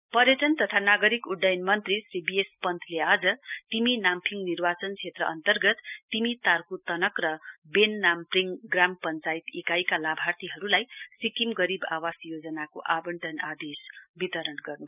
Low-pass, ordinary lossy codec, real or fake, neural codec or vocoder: 3.6 kHz; none; real; none